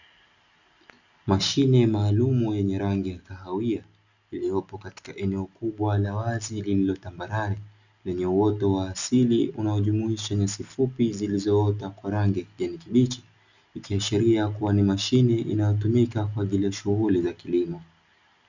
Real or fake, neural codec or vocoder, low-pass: real; none; 7.2 kHz